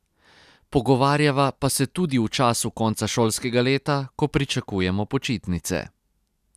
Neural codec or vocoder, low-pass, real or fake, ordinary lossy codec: none; 14.4 kHz; real; none